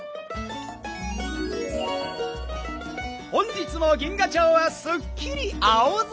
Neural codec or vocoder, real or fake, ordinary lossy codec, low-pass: none; real; none; none